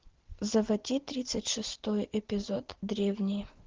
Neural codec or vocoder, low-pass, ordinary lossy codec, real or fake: none; 7.2 kHz; Opus, 16 kbps; real